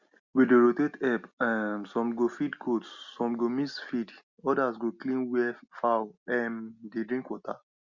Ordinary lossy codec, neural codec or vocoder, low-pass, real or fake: Opus, 64 kbps; none; 7.2 kHz; real